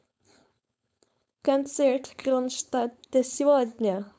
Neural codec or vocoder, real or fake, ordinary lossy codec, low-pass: codec, 16 kHz, 4.8 kbps, FACodec; fake; none; none